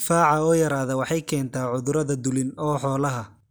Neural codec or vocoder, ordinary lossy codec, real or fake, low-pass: none; none; real; none